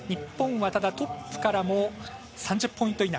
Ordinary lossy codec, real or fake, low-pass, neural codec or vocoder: none; real; none; none